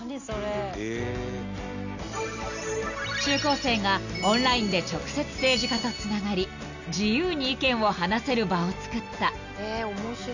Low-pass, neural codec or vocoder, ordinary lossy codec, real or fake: 7.2 kHz; none; none; real